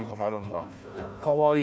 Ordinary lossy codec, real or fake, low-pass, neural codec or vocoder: none; fake; none; codec, 16 kHz, 1 kbps, FunCodec, trained on Chinese and English, 50 frames a second